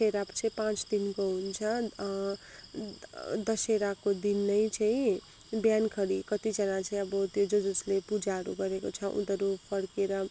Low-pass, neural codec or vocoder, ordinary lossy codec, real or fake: none; none; none; real